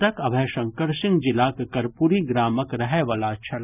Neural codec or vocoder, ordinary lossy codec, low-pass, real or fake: none; none; 3.6 kHz; real